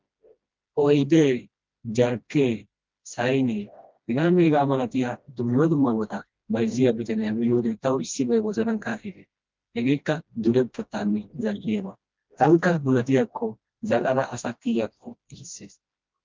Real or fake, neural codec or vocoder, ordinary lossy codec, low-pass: fake; codec, 16 kHz, 1 kbps, FreqCodec, smaller model; Opus, 24 kbps; 7.2 kHz